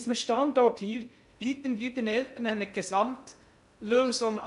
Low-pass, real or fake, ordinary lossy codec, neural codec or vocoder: 10.8 kHz; fake; none; codec, 16 kHz in and 24 kHz out, 0.6 kbps, FocalCodec, streaming, 2048 codes